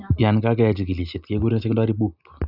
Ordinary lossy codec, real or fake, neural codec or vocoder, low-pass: none; real; none; 5.4 kHz